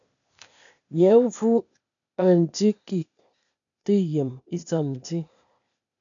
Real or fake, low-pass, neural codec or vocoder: fake; 7.2 kHz; codec, 16 kHz, 0.8 kbps, ZipCodec